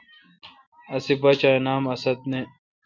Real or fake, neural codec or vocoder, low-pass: real; none; 7.2 kHz